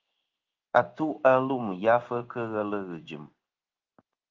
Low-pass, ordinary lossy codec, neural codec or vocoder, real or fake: 7.2 kHz; Opus, 24 kbps; codec, 16 kHz in and 24 kHz out, 1 kbps, XY-Tokenizer; fake